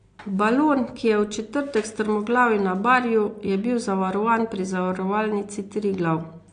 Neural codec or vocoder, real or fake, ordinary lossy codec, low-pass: none; real; AAC, 48 kbps; 9.9 kHz